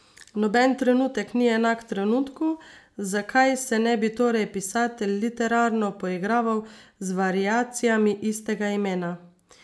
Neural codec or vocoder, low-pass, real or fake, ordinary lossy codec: none; none; real; none